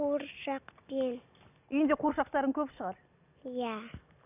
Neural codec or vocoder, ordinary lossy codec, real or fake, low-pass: none; none; real; 3.6 kHz